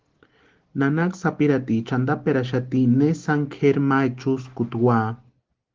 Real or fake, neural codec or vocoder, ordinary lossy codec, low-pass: real; none; Opus, 16 kbps; 7.2 kHz